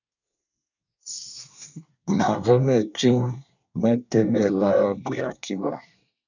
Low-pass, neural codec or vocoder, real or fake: 7.2 kHz; codec, 24 kHz, 1 kbps, SNAC; fake